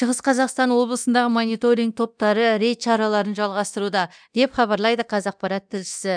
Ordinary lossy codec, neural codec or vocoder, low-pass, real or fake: none; codec, 24 kHz, 0.9 kbps, DualCodec; 9.9 kHz; fake